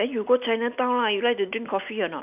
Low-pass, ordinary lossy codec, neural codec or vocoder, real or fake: 3.6 kHz; none; none; real